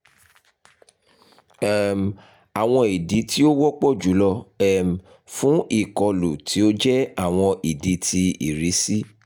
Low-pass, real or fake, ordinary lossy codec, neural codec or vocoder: none; real; none; none